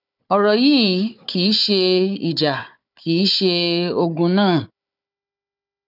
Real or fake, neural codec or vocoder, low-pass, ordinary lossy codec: fake; codec, 16 kHz, 4 kbps, FunCodec, trained on Chinese and English, 50 frames a second; 5.4 kHz; none